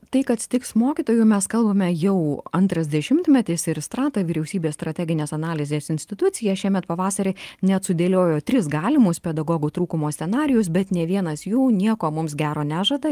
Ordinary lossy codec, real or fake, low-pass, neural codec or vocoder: Opus, 32 kbps; real; 14.4 kHz; none